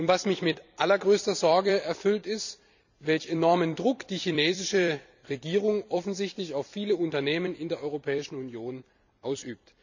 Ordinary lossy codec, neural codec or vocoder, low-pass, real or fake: none; vocoder, 44.1 kHz, 128 mel bands every 256 samples, BigVGAN v2; 7.2 kHz; fake